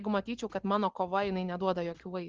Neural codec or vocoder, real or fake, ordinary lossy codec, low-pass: none; real; Opus, 16 kbps; 7.2 kHz